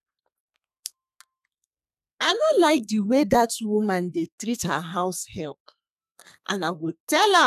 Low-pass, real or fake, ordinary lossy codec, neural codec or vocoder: 14.4 kHz; fake; none; codec, 32 kHz, 1.9 kbps, SNAC